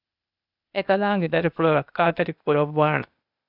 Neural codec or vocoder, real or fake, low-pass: codec, 16 kHz, 0.8 kbps, ZipCodec; fake; 5.4 kHz